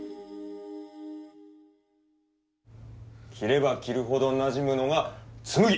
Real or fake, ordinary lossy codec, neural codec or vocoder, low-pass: real; none; none; none